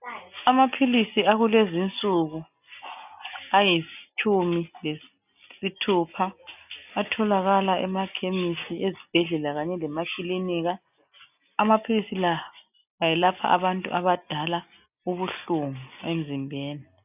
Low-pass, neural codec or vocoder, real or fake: 3.6 kHz; none; real